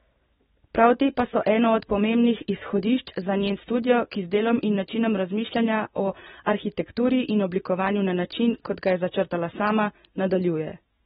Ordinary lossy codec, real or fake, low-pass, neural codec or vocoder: AAC, 16 kbps; real; 14.4 kHz; none